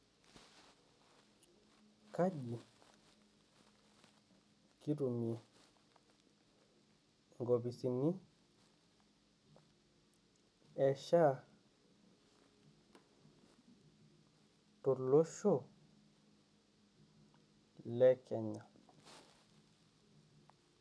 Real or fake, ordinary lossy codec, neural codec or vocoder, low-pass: real; none; none; none